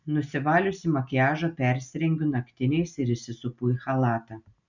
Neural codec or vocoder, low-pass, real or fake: none; 7.2 kHz; real